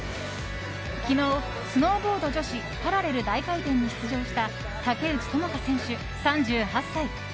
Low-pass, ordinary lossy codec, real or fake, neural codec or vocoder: none; none; real; none